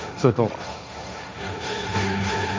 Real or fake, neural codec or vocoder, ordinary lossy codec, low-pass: fake; codec, 16 kHz, 1.1 kbps, Voila-Tokenizer; none; none